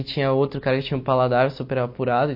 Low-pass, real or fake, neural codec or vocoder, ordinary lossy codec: 5.4 kHz; real; none; MP3, 32 kbps